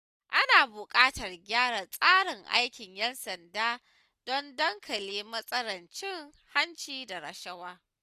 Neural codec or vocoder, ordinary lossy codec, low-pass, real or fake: none; none; 14.4 kHz; real